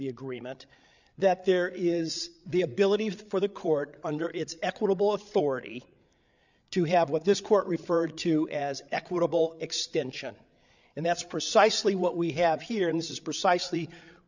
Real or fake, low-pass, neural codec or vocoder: fake; 7.2 kHz; codec, 16 kHz, 8 kbps, FreqCodec, larger model